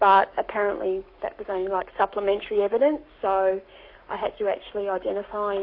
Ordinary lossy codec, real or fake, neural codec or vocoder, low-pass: AAC, 32 kbps; fake; codec, 44.1 kHz, 7.8 kbps, Pupu-Codec; 5.4 kHz